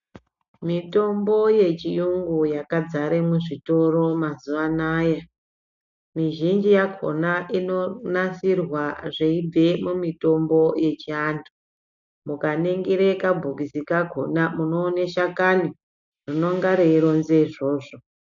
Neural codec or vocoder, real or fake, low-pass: none; real; 7.2 kHz